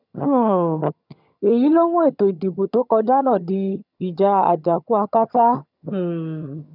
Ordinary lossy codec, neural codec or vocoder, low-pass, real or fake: none; vocoder, 22.05 kHz, 80 mel bands, HiFi-GAN; 5.4 kHz; fake